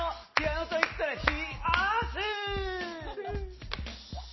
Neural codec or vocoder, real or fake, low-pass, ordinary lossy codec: none; real; 7.2 kHz; MP3, 24 kbps